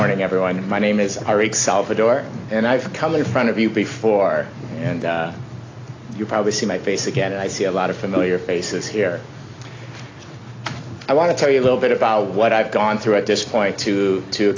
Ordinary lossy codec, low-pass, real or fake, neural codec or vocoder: AAC, 32 kbps; 7.2 kHz; real; none